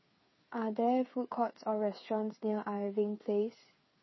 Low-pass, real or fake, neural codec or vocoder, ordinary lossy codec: 7.2 kHz; fake; codec, 16 kHz, 8 kbps, FreqCodec, smaller model; MP3, 24 kbps